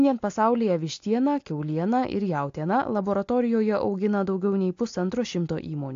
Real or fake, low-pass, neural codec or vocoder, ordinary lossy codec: real; 7.2 kHz; none; AAC, 48 kbps